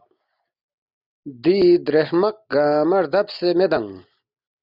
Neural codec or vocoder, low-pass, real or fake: none; 5.4 kHz; real